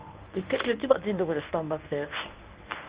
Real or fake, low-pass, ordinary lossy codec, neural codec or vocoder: fake; 3.6 kHz; Opus, 24 kbps; codec, 24 kHz, 0.9 kbps, WavTokenizer, medium speech release version 1